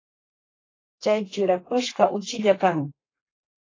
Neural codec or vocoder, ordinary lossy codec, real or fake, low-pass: codec, 32 kHz, 1.9 kbps, SNAC; AAC, 32 kbps; fake; 7.2 kHz